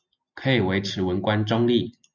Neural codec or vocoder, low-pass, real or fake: none; 7.2 kHz; real